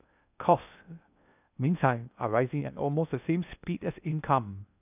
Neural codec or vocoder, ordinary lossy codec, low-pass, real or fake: codec, 16 kHz in and 24 kHz out, 0.6 kbps, FocalCodec, streaming, 2048 codes; none; 3.6 kHz; fake